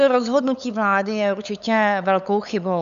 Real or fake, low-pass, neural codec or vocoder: fake; 7.2 kHz; codec, 16 kHz, 8 kbps, FunCodec, trained on LibriTTS, 25 frames a second